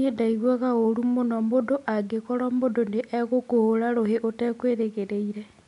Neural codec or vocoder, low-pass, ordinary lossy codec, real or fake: none; 10.8 kHz; none; real